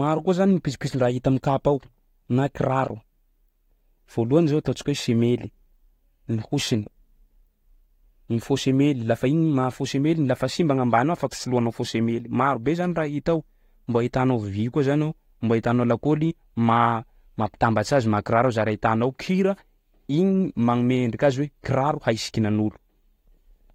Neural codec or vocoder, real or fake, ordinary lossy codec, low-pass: none; real; AAC, 48 kbps; 19.8 kHz